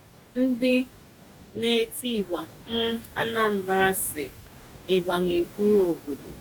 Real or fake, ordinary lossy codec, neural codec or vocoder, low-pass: fake; none; codec, 44.1 kHz, 2.6 kbps, DAC; 19.8 kHz